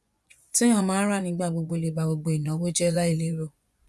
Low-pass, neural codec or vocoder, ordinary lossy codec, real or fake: none; vocoder, 24 kHz, 100 mel bands, Vocos; none; fake